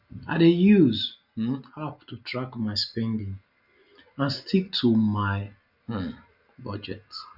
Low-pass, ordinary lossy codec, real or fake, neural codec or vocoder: 5.4 kHz; none; real; none